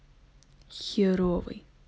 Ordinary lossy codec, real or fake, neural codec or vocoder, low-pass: none; real; none; none